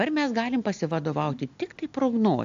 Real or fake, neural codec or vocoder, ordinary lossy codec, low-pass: real; none; AAC, 64 kbps; 7.2 kHz